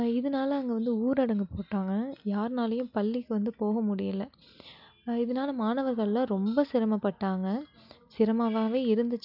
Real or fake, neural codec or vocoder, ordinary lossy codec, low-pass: real; none; none; 5.4 kHz